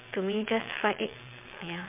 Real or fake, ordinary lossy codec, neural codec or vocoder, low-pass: fake; MP3, 32 kbps; vocoder, 22.05 kHz, 80 mel bands, WaveNeXt; 3.6 kHz